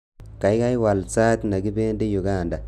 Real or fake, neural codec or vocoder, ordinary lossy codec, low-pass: real; none; none; 14.4 kHz